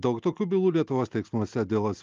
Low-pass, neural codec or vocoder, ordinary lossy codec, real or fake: 7.2 kHz; none; Opus, 32 kbps; real